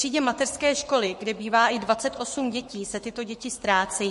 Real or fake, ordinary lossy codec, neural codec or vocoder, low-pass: fake; MP3, 48 kbps; codec, 44.1 kHz, 7.8 kbps, Pupu-Codec; 14.4 kHz